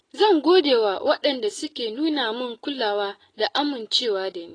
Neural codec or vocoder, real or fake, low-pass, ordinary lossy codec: none; real; 9.9 kHz; AAC, 32 kbps